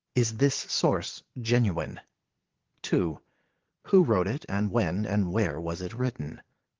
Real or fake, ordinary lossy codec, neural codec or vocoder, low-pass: fake; Opus, 16 kbps; vocoder, 22.05 kHz, 80 mel bands, Vocos; 7.2 kHz